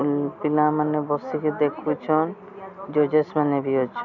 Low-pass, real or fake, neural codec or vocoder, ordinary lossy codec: 7.2 kHz; real; none; none